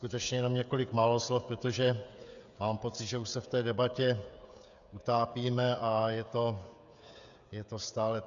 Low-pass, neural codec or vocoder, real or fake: 7.2 kHz; codec, 16 kHz, 16 kbps, FreqCodec, smaller model; fake